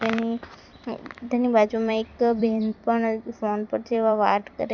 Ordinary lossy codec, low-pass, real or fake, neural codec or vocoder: none; 7.2 kHz; fake; vocoder, 44.1 kHz, 128 mel bands every 256 samples, BigVGAN v2